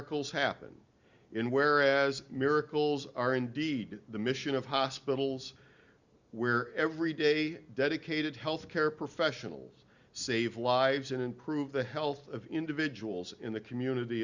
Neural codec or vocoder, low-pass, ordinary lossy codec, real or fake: none; 7.2 kHz; Opus, 64 kbps; real